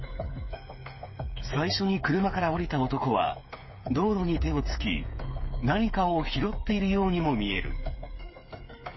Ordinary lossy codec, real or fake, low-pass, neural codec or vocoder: MP3, 24 kbps; fake; 7.2 kHz; codec, 16 kHz, 8 kbps, FreqCodec, smaller model